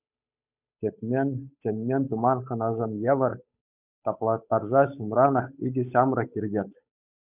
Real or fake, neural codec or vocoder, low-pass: fake; codec, 16 kHz, 8 kbps, FunCodec, trained on Chinese and English, 25 frames a second; 3.6 kHz